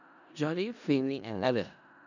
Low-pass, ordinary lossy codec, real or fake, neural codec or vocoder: 7.2 kHz; none; fake; codec, 16 kHz in and 24 kHz out, 0.4 kbps, LongCat-Audio-Codec, four codebook decoder